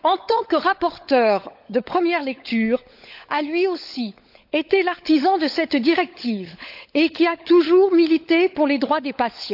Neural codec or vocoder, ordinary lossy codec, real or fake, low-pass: codec, 16 kHz, 16 kbps, FunCodec, trained on LibriTTS, 50 frames a second; AAC, 48 kbps; fake; 5.4 kHz